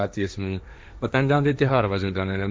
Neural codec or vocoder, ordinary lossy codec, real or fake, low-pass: codec, 16 kHz, 1.1 kbps, Voila-Tokenizer; none; fake; none